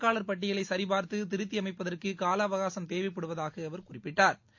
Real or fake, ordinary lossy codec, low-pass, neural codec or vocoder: real; MP3, 48 kbps; 7.2 kHz; none